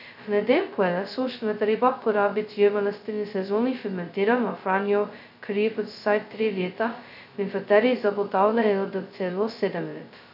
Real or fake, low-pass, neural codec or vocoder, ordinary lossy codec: fake; 5.4 kHz; codec, 16 kHz, 0.2 kbps, FocalCodec; none